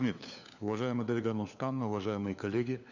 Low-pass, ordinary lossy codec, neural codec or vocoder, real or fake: 7.2 kHz; none; codec, 16 kHz, 4 kbps, FunCodec, trained on LibriTTS, 50 frames a second; fake